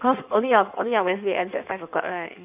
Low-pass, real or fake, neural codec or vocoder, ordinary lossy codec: 3.6 kHz; fake; codec, 16 kHz in and 24 kHz out, 1.1 kbps, FireRedTTS-2 codec; none